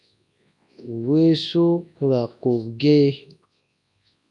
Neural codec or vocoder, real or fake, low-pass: codec, 24 kHz, 0.9 kbps, WavTokenizer, large speech release; fake; 10.8 kHz